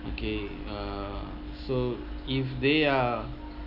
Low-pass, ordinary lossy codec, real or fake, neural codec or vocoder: 5.4 kHz; none; real; none